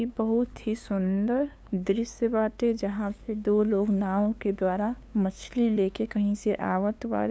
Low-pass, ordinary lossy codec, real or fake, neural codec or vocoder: none; none; fake; codec, 16 kHz, 2 kbps, FunCodec, trained on LibriTTS, 25 frames a second